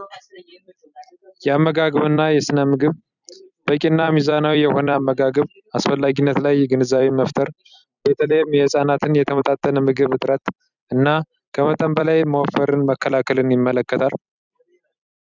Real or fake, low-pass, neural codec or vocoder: fake; 7.2 kHz; vocoder, 44.1 kHz, 128 mel bands every 256 samples, BigVGAN v2